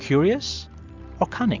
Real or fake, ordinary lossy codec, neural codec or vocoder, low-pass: real; MP3, 64 kbps; none; 7.2 kHz